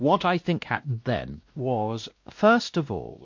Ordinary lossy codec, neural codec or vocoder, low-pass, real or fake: MP3, 48 kbps; codec, 16 kHz, 1 kbps, X-Codec, WavLM features, trained on Multilingual LibriSpeech; 7.2 kHz; fake